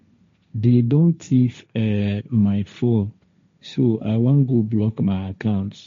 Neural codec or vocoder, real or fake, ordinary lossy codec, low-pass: codec, 16 kHz, 1.1 kbps, Voila-Tokenizer; fake; MP3, 48 kbps; 7.2 kHz